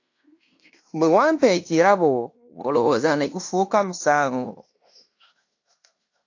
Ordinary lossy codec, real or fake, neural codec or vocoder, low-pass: AAC, 48 kbps; fake; codec, 16 kHz in and 24 kHz out, 0.9 kbps, LongCat-Audio-Codec, fine tuned four codebook decoder; 7.2 kHz